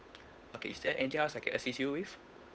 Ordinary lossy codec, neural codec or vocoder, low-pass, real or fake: none; codec, 16 kHz, 8 kbps, FunCodec, trained on Chinese and English, 25 frames a second; none; fake